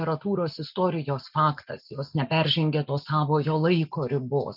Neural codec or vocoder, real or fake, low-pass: none; real; 5.4 kHz